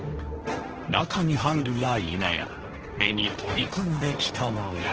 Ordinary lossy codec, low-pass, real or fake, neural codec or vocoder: Opus, 16 kbps; 7.2 kHz; fake; codec, 16 kHz, 1.1 kbps, Voila-Tokenizer